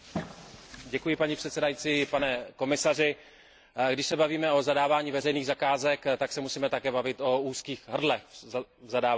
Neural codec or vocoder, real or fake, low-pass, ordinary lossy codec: none; real; none; none